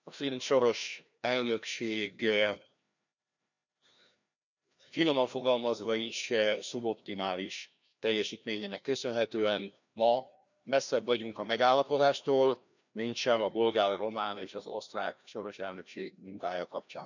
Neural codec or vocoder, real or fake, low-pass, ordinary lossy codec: codec, 16 kHz, 1 kbps, FreqCodec, larger model; fake; 7.2 kHz; none